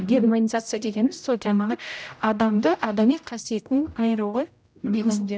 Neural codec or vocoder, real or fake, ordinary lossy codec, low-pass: codec, 16 kHz, 0.5 kbps, X-Codec, HuBERT features, trained on general audio; fake; none; none